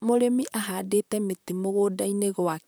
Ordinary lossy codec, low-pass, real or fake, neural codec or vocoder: none; none; fake; vocoder, 44.1 kHz, 128 mel bands, Pupu-Vocoder